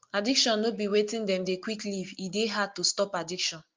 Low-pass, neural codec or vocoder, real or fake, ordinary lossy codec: 7.2 kHz; none; real; Opus, 24 kbps